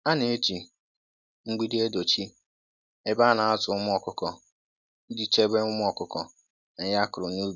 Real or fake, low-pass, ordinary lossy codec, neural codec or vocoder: real; 7.2 kHz; none; none